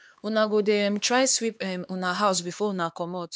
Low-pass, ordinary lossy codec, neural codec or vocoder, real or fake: none; none; codec, 16 kHz, 2 kbps, X-Codec, HuBERT features, trained on LibriSpeech; fake